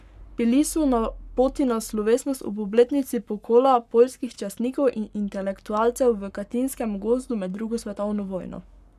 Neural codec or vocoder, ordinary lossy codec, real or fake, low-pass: codec, 44.1 kHz, 7.8 kbps, Pupu-Codec; none; fake; 14.4 kHz